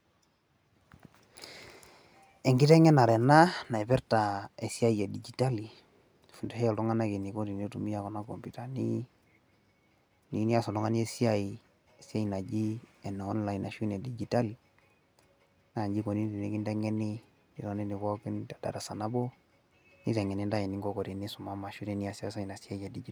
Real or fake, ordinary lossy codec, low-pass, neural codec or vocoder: real; none; none; none